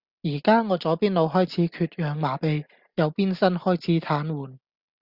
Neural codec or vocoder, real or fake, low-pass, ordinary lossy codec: none; real; 5.4 kHz; Opus, 64 kbps